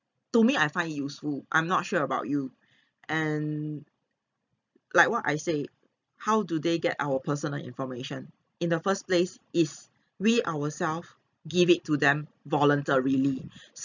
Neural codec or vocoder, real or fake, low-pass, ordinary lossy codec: none; real; 7.2 kHz; none